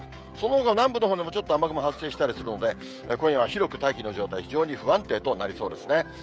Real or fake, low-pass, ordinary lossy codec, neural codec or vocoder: fake; none; none; codec, 16 kHz, 16 kbps, FreqCodec, smaller model